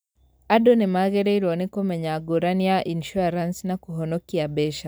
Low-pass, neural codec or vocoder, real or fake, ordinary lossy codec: none; none; real; none